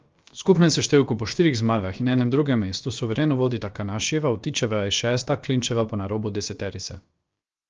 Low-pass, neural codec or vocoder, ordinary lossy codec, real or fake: 7.2 kHz; codec, 16 kHz, about 1 kbps, DyCAST, with the encoder's durations; Opus, 24 kbps; fake